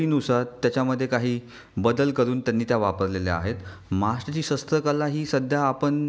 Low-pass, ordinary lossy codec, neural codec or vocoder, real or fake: none; none; none; real